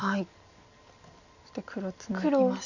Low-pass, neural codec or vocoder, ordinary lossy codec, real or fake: 7.2 kHz; none; none; real